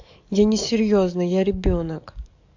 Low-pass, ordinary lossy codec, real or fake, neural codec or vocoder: 7.2 kHz; AAC, 48 kbps; fake; codec, 44.1 kHz, 7.8 kbps, DAC